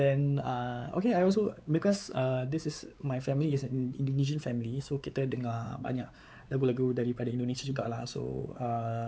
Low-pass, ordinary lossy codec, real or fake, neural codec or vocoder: none; none; fake; codec, 16 kHz, 4 kbps, X-Codec, WavLM features, trained on Multilingual LibriSpeech